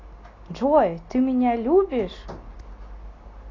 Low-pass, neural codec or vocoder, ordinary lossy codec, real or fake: 7.2 kHz; none; AAC, 32 kbps; real